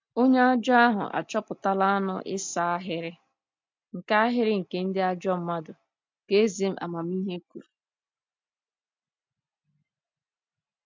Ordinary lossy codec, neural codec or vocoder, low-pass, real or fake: MP3, 64 kbps; none; 7.2 kHz; real